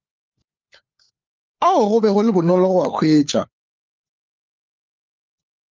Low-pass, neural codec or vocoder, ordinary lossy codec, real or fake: 7.2 kHz; codec, 16 kHz, 4 kbps, FunCodec, trained on LibriTTS, 50 frames a second; Opus, 24 kbps; fake